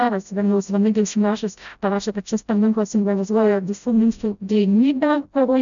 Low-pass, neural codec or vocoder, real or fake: 7.2 kHz; codec, 16 kHz, 0.5 kbps, FreqCodec, smaller model; fake